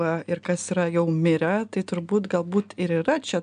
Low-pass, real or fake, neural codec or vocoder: 9.9 kHz; real; none